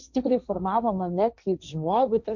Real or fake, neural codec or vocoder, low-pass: fake; codec, 16 kHz, 1.1 kbps, Voila-Tokenizer; 7.2 kHz